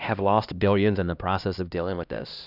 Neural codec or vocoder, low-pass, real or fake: codec, 16 kHz, 1 kbps, X-Codec, HuBERT features, trained on LibriSpeech; 5.4 kHz; fake